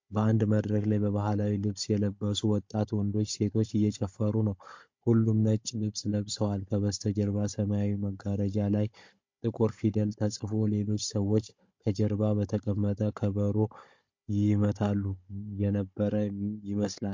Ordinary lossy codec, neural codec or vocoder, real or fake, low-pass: MP3, 48 kbps; codec, 16 kHz, 16 kbps, FunCodec, trained on Chinese and English, 50 frames a second; fake; 7.2 kHz